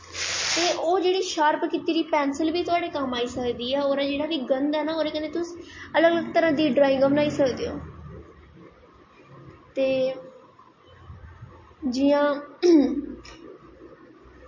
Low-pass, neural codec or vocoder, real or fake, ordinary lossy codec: 7.2 kHz; none; real; MP3, 32 kbps